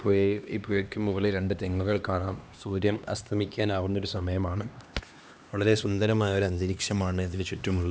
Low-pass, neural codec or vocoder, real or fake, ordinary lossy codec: none; codec, 16 kHz, 2 kbps, X-Codec, HuBERT features, trained on LibriSpeech; fake; none